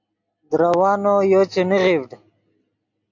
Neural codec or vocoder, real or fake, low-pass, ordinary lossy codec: none; real; 7.2 kHz; AAC, 48 kbps